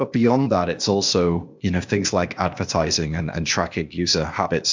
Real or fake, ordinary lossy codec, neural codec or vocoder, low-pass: fake; MP3, 64 kbps; codec, 16 kHz, 0.8 kbps, ZipCodec; 7.2 kHz